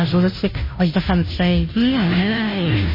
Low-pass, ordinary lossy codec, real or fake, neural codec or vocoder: 5.4 kHz; MP3, 24 kbps; fake; codec, 16 kHz, 0.5 kbps, FunCodec, trained on Chinese and English, 25 frames a second